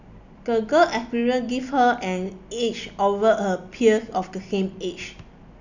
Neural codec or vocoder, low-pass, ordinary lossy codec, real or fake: none; 7.2 kHz; none; real